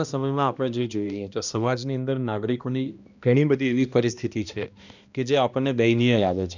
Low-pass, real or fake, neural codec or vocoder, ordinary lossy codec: 7.2 kHz; fake; codec, 16 kHz, 1 kbps, X-Codec, HuBERT features, trained on balanced general audio; none